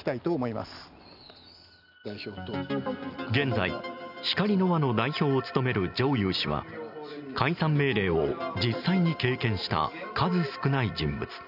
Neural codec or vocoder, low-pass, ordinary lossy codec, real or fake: none; 5.4 kHz; none; real